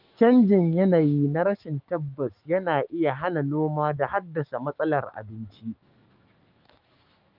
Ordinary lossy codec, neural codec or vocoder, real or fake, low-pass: Opus, 32 kbps; autoencoder, 48 kHz, 128 numbers a frame, DAC-VAE, trained on Japanese speech; fake; 5.4 kHz